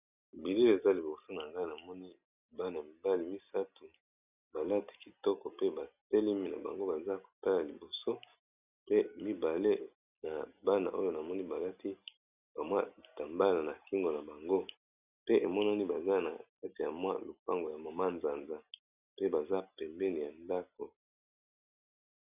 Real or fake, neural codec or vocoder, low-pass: real; none; 3.6 kHz